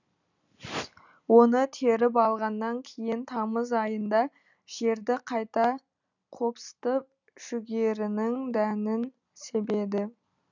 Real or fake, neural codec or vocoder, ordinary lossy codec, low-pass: real; none; none; 7.2 kHz